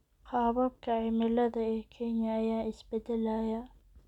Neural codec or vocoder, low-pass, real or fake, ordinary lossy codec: none; 19.8 kHz; real; none